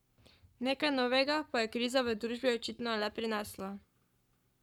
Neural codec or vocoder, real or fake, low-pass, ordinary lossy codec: codec, 44.1 kHz, 7.8 kbps, Pupu-Codec; fake; 19.8 kHz; none